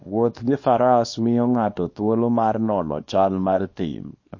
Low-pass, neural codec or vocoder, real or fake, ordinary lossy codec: 7.2 kHz; codec, 24 kHz, 0.9 kbps, WavTokenizer, small release; fake; MP3, 32 kbps